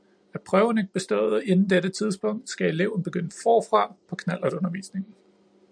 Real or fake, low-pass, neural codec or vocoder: real; 9.9 kHz; none